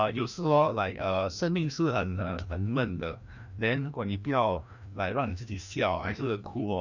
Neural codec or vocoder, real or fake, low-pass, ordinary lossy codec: codec, 16 kHz, 1 kbps, FreqCodec, larger model; fake; 7.2 kHz; none